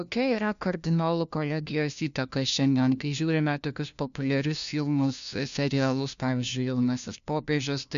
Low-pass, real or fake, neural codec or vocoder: 7.2 kHz; fake; codec, 16 kHz, 1 kbps, FunCodec, trained on LibriTTS, 50 frames a second